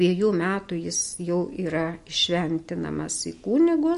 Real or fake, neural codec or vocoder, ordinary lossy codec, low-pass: real; none; MP3, 48 kbps; 14.4 kHz